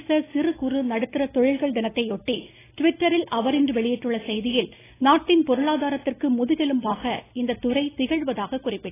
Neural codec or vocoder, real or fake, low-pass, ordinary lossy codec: none; real; 3.6 kHz; AAC, 16 kbps